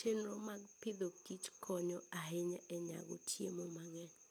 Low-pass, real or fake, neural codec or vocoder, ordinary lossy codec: none; real; none; none